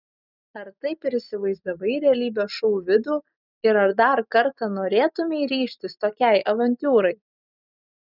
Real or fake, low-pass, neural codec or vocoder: real; 5.4 kHz; none